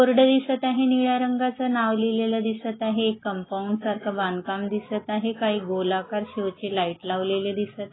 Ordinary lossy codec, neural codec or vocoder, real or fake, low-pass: AAC, 16 kbps; none; real; 7.2 kHz